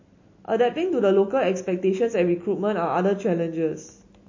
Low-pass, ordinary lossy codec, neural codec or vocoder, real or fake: 7.2 kHz; MP3, 32 kbps; none; real